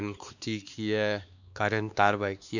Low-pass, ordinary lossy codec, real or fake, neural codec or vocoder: 7.2 kHz; none; fake; codec, 16 kHz, 2 kbps, X-Codec, WavLM features, trained on Multilingual LibriSpeech